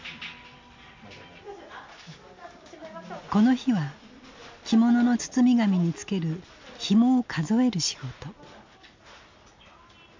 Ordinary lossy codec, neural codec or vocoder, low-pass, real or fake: MP3, 64 kbps; none; 7.2 kHz; real